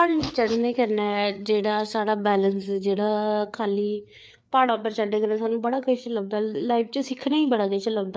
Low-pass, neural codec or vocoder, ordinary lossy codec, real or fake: none; codec, 16 kHz, 4 kbps, FreqCodec, larger model; none; fake